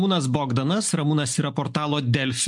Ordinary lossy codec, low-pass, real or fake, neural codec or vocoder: MP3, 64 kbps; 10.8 kHz; real; none